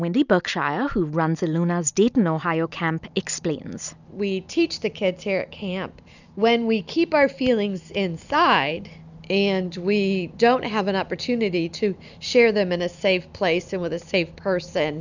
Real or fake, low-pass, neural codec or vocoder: real; 7.2 kHz; none